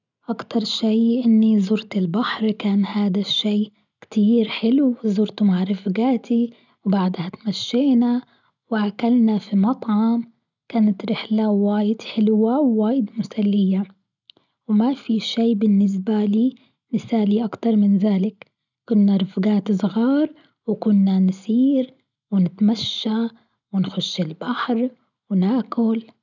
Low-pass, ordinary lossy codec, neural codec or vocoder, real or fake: 7.2 kHz; none; none; real